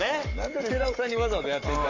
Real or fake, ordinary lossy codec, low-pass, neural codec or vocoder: fake; none; 7.2 kHz; codec, 44.1 kHz, 7.8 kbps, Pupu-Codec